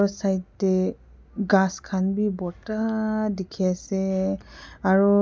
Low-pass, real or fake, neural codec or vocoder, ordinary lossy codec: none; real; none; none